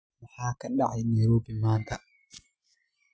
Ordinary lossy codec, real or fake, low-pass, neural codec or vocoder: none; real; none; none